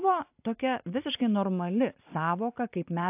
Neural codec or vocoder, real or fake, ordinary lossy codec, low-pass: none; real; AAC, 32 kbps; 3.6 kHz